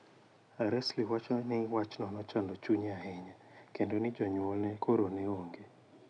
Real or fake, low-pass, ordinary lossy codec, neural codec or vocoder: real; 9.9 kHz; none; none